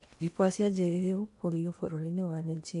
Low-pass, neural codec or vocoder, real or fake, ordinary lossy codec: 10.8 kHz; codec, 16 kHz in and 24 kHz out, 0.8 kbps, FocalCodec, streaming, 65536 codes; fake; AAC, 64 kbps